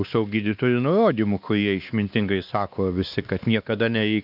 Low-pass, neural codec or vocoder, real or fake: 5.4 kHz; codec, 16 kHz, 2 kbps, X-Codec, WavLM features, trained on Multilingual LibriSpeech; fake